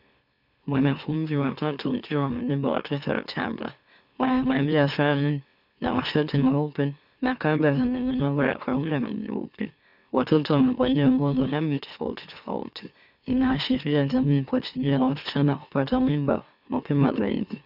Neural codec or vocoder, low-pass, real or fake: autoencoder, 44.1 kHz, a latent of 192 numbers a frame, MeloTTS; 5.4 kHz; fake